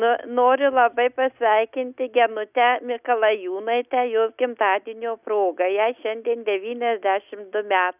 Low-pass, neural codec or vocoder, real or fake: 3.6 kHz; none; real